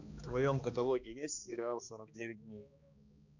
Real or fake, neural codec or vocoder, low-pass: fake; codec, 16 kHz, 2 kbps, X-Codec, HuBERT features, trained on balanced general audio; 7.2 kHz